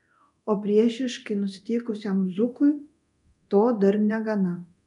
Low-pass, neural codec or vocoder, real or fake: 10.8 kHz; codec, 24 kHz, 0.9 kbps, DualCodec; fake